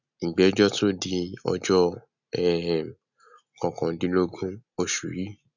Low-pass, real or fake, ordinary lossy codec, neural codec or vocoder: 7.2 kHz; real; none; none